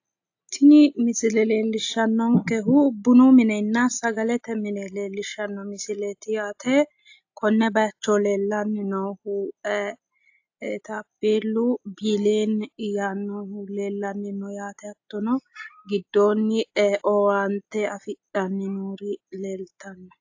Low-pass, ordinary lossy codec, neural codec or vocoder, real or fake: 7.2 kHz; AAC, 48 kbps; none; real